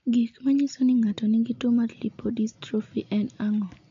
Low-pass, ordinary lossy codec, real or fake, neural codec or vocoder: 7.2 kHz; MP3, 48 kbps; real; none